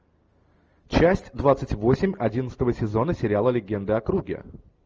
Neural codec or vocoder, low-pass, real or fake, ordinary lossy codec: none; 7.2 kHz; real; Opus, 24 kbps